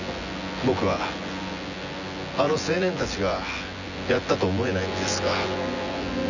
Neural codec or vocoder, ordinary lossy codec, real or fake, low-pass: vocoder, 24 kHz, 100 mel bands, Vocos; none; fake; 7.2 kHz